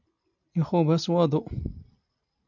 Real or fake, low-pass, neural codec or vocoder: real; 7.2 kHz; none